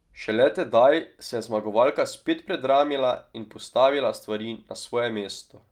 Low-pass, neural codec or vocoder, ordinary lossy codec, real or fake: 19.8 kHz; none; Opus, 32 kbps; real